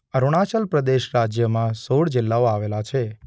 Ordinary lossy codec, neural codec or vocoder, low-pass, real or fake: none; none; none; real